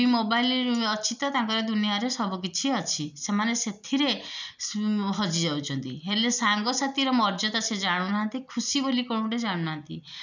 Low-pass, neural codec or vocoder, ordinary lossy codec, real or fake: 7.2 kHz; none; none; real